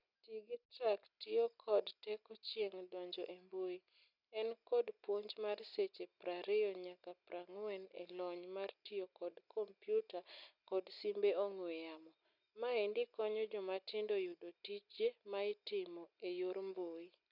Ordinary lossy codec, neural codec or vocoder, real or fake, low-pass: none; none; real; 5.4 kHz